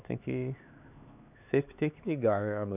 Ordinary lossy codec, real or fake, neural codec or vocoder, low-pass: none; fake; codec, 16 kHz, 4 kbps, X-Codec, HuBERT features, trained on LibriSpeech; 3.6 kHz